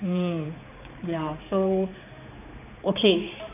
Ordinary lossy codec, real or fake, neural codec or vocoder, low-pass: none; fake; codec, 16 kHz, 4 kbps, X-Codec, HuBERT features, trained on general audio; 3.6 kHz